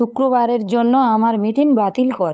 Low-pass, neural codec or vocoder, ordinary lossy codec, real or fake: none; codec, 16 kHz, 8 kbps, FunCodec, trained on LibriTTS, 25 frames a second; none; fake